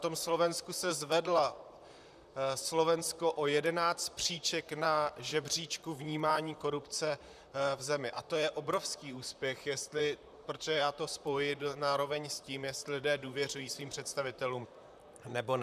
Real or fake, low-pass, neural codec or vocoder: fake; 14.4 kHz; vocoder, 44.1 kHz, 128 mel bands, Pupu-Vocoder